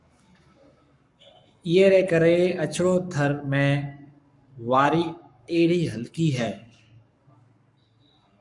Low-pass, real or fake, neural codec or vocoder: 10.8 kHz; fake; codec, 44.1 kHz, 7.8 kbps, Pupu-Codec